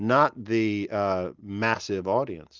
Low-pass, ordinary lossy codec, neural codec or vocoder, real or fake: 7.2 kHz; Opus, 24 kbps; none; real